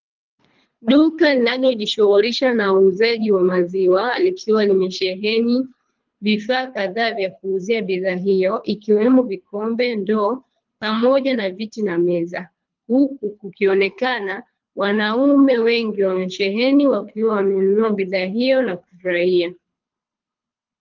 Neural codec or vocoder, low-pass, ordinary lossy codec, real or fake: codec, 24 kHz, 3 kbps, HILCodec; 7.2 kHz; Opus, 24 kbps; fake